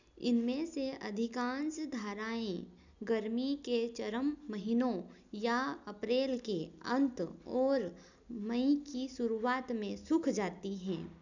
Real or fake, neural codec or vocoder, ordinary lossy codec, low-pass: real; none; none; 7.2 kHz